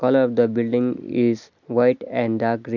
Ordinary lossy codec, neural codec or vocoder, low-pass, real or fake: none; none; 7.2 kHz; real